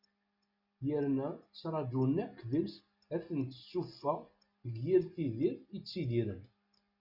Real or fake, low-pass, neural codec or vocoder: real; 5.4 kHz; none